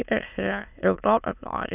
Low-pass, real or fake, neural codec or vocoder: 3.6 kHz; fake; autoencoder, 22.05 kHz, a latent of 192 numbers a frame, VITS, trained on many speakers